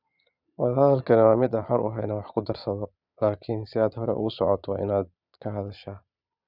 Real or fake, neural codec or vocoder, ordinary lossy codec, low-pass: fake; vocoder, 22.05 kHz, 80 mel bands, Vocos; none; 5.4 kHz